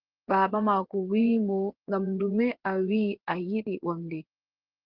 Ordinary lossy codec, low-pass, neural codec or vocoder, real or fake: Opus, 16 kbps; 5.4 kHz; vocoder, 24 kHz, 100 mel bands, Vocos; fake